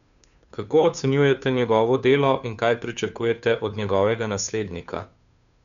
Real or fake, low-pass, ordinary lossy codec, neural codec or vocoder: fake; 7.2 kHz; none; codec, 16 kHz, 2 kbps, FunCodec, trained on Chinese and English, 25 frames a second